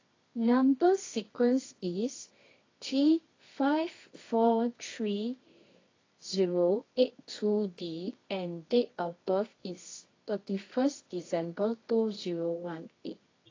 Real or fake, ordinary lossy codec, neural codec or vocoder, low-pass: fake; AAC, 32 kbps; codec, 24 kHz, 0.9 kbps, WavTokenizer, medium music audio release; 7.2 kHz